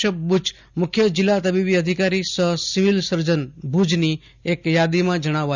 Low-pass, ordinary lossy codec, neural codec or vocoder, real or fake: 7.2 kHz; none; none; real